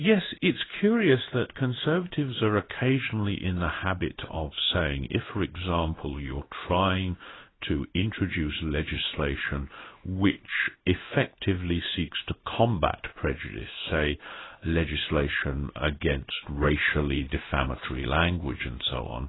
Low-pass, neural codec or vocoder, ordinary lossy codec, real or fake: 7.2 kHz; codec, 16 kHz in and 24 kHz out, 1 kbps, XY-Tokenizer; AAC, 16 kbps; fake